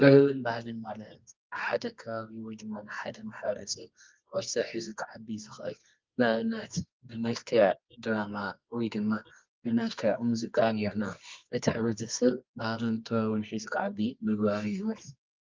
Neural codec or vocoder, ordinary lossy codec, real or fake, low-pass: codec, 24 kHz, 0.9 kbps, WavTokenizer, medium music audio release; Opus, 24 kbps; fake; 7.2 kHz